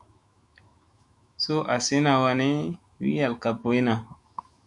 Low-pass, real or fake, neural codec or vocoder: 10.8 kHz; fake; autoencoder, 48 kHz, 128 numbers a frame, DAC-VAE, trained on Japanese speech